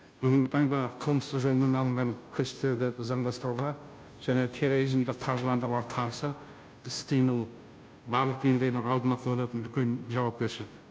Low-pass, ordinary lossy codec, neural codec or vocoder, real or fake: none; none; codec, 16 kHz, 0.5 kbps, FunCodec, trained on Chinese and English, 25 frames a second; fake